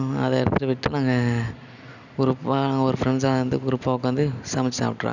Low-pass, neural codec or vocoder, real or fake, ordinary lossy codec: 7.2 kHz; none; real; none